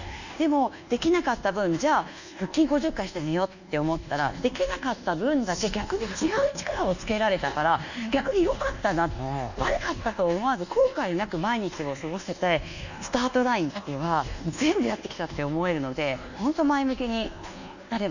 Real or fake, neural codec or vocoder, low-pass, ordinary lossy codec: fake; codec, 24 kHz, 1.2 kbps, DualCodec; 7.2 kHz; none